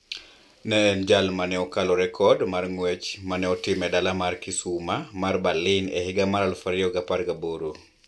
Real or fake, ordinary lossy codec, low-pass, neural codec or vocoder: real; none; none; none